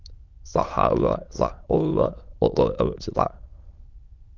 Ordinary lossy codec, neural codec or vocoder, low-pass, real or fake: Opus, 24 kbps; autoencoder, 22.05 kHz, a latent of 192 numbers a frame, VITS, trained on many speakers; 7.2 kHz; fake